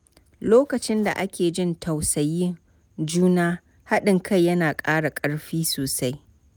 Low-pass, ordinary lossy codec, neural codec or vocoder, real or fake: none; none; none; real